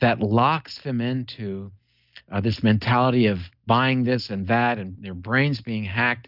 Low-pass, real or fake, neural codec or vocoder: 5.4 kHz; real; none